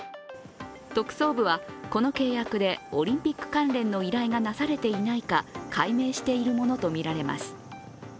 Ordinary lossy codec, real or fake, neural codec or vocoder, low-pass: none; real; none; none